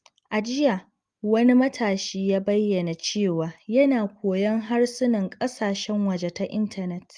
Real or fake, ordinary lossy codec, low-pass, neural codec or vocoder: real; Opus, 32 kbps; 9.9 kHz; none